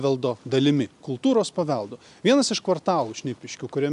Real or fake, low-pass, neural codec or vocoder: real; 10.8 kHz; none